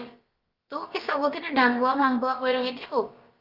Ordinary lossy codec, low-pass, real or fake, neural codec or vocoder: Opus, 32 kbps; 5.4 kHz; fake; codec, 16 kHz, about 1 kbps, DyCAST, with the encoder's durations